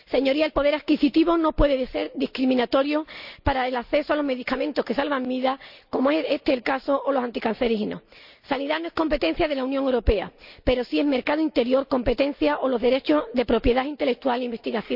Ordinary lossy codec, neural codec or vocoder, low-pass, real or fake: none; none; 5.4 kHz; real